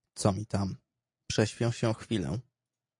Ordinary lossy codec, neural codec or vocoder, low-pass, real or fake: MP3, 48 kbps; none; 10.8 kHz; real